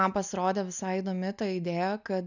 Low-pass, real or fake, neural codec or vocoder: 7.2 kHz; real; none